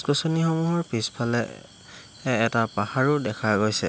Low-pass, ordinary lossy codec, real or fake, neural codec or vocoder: none; none; real; none